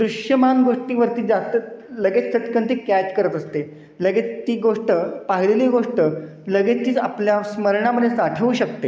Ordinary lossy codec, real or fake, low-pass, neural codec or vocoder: none; real; none; none